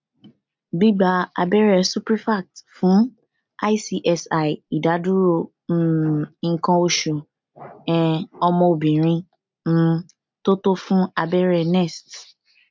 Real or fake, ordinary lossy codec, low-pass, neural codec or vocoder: real; MP3, 64 kbps; 7.2 kHz; none